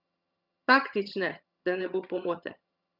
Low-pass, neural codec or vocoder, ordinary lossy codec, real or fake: 5.4 kHz; vocoder, 22.05 kHz, 80 mel bands, HiFi-GAN; Opus, 64 kbps; fake